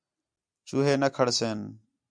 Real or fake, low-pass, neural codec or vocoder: real; 9.9 kHz; none